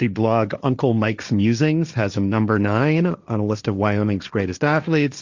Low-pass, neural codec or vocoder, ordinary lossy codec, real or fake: 7.2 kHz; codec, 16 kHz, 1.1 kbps, Voila-Tokenizer; Opus, 64 kbps; fake